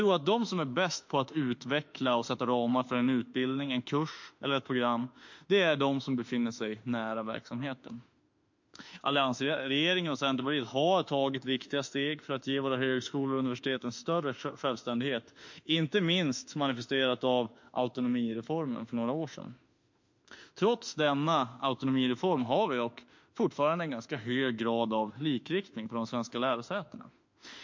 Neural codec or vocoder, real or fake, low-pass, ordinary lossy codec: autoencoder, 48 kHz, 32 numbers a frame, DAC-VAE, trained on Japanese speech; fake; 7.2 kHz; MP3, 48 kbps